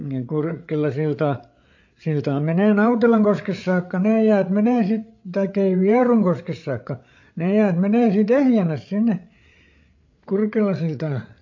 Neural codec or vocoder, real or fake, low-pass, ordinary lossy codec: codec, 16 kHz, 8 kbps, FreqCodec, larger model; fake; 7.2 kHz; MP3, 48 kbps